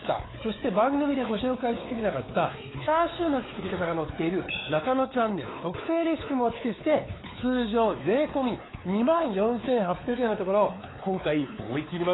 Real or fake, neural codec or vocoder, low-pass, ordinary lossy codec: fake; codec, 16 kHz, 4 kbps, X-Codec, WavLM features, trained on Multilingual LibriSpeech; 7.2 kHz; AAC, 16 kbps